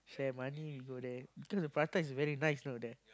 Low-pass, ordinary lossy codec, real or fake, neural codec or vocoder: none; none; real; none